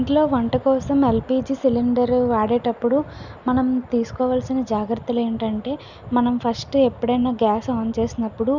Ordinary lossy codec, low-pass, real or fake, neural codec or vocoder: none; 7.2 kHz; real; none